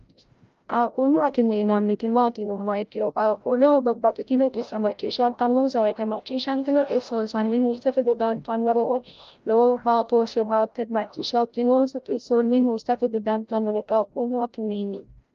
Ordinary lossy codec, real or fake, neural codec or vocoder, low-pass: Opus, 32 kbps; fake; codec, 16 kHz, 0.5 kbps, FreqCodec, larger model; 7.2 kHz